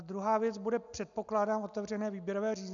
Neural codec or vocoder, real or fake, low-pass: none; real; 7.2 kHz